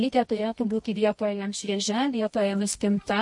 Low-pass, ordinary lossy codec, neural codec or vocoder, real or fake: 10.8 kHz; MP3, 48 kbps; codec, 24 kHz, 0.9 kbps, WavTokenizer, medium music audio release; fake